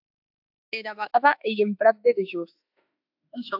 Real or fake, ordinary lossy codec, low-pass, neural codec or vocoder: fake; AAC, 48 kbps; 5.4 kHz; autoencoder, 48 kHz, 32 numbers a frame, DAC-VAE, trained on Japanese speech